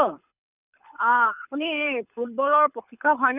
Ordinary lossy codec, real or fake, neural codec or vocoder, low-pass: none; fake; codec, 24 kHz, 6 kbps, HILCodec; 3.6 kHz